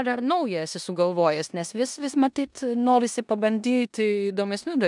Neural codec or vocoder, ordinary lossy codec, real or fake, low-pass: codec, 16 kHz in and 24 kHz out, 0.9 kbps, LongCat-Audio-Codec, four codebook decoder; MP3, 96 kbps; fake; 10.8 kHz